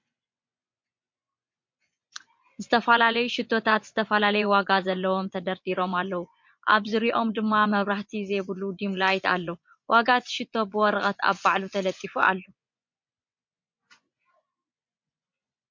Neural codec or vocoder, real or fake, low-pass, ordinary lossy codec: vocoder, 24 kHz, 100 mel bands, Vocos; fake; 7.2 kHz; MP3, 48 kbps